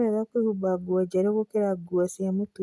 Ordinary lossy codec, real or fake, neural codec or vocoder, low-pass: none; real; none; none